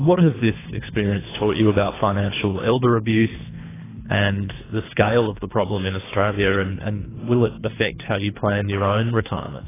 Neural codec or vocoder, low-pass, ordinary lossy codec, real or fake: codec, 24 kHz, 3 kbps, HILCodec; 3.6 kHz; AAC, 16 kbps; fake